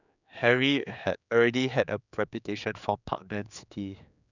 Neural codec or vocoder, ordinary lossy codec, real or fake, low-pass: codec, 16 kHz, 4 kbps, X-Codec, HuBERT features, trained on general audio; none; fake; 7.2 kHz